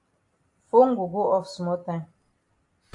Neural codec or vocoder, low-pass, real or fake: none; 10.8 kHz; real